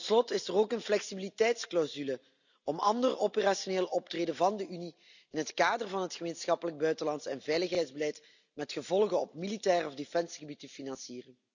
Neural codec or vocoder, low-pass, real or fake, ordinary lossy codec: none; 7.2 kHz; real; none